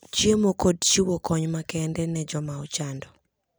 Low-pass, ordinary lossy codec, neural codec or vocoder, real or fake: none; none; none; real